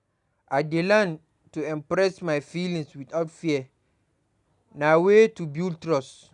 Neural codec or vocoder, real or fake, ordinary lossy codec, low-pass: none; real; none; 10.8 kHz